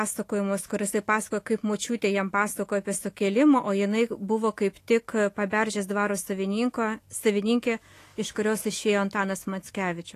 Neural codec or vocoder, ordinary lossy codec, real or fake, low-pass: autoencoder, 48 kHz, 128 numbers a frame, DAC-VAE, trained on Japanese speech; AAC, 48 kbps; fake; 14.4 kHz